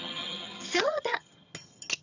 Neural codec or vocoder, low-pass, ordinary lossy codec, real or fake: vocoder, 22.05 kHz, 80 mel bands, HiFi-GAN; 7.2 kHz; none; fake